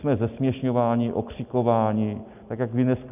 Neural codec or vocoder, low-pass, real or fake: none; 3.6 kHz; real